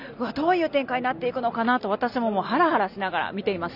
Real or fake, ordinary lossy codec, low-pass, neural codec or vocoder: real; none; 5.4 kHz; none